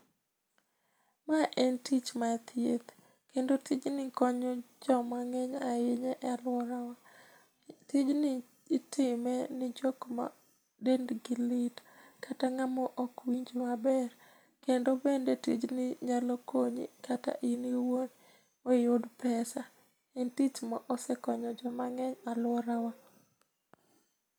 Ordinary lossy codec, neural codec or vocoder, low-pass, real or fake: none; none; none; real